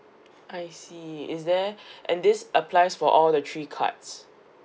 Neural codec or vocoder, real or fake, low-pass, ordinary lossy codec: none; real; none; none